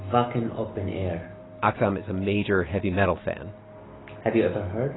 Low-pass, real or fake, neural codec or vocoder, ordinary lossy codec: 7.2 kHz; real; none; AAC, 16 kbps